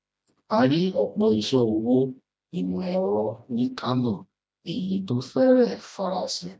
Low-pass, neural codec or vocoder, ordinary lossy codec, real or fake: none; codec, 16 kHz, 1 kbps, FreqCodec, smaller model; none; fake